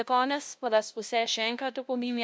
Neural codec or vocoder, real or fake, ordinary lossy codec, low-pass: codec, 16 kHz, 0.5 kbps, FunCodec, trained on LibriTTS, 25 frames a second; fake; none; none